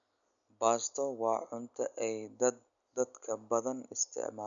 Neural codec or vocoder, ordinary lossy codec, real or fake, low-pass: none; none; real; 7.2 kHz